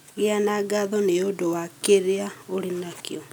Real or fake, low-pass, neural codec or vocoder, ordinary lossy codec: real; none; none; none